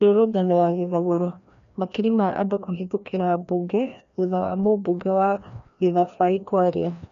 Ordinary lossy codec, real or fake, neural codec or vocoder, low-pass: none; fake; codec, 16 kHz, 1 kbps, FreqCodec, larger model; 7.2 kHz